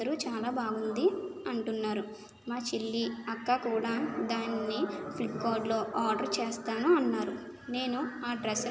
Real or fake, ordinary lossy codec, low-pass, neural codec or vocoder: real; none; none; none